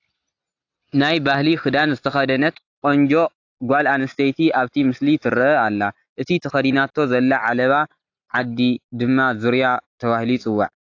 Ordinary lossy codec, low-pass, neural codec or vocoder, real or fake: AAC, 48 kbps; 7.2 kHz; none; real